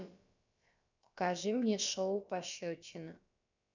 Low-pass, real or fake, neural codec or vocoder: 7.2 kHz; fake; codec, 16 kHz, about 1 kbps, DyCAST, with the encoder's durations